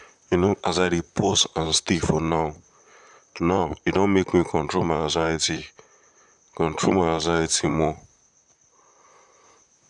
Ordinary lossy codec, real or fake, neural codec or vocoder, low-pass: none; fake; vocoder, 44.1 kHz, 128 mel bands, Pupu-Vocoder; 10.8 kHz